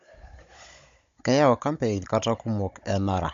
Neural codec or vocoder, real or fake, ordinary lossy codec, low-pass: codec, 16 kHz, 16 kbps, FunCodec, trained on Chinese and English, 50 frames a second; fake; MP3, 48 kbps; 7.2 kHz